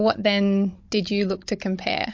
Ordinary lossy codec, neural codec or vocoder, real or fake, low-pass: MP3, 48 kbps; codec, 16 kHz, 16 kbps, FunCodec, trained on Chinese and English, 50 frames a second; fake; 7.2 kHz